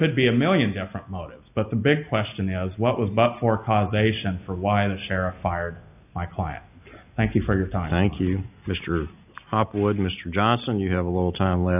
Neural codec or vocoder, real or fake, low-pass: none; real; 3.6 kHz